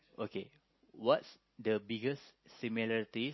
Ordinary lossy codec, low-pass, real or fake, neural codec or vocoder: MP3, 24 kbps; 7.2 kHz; real; none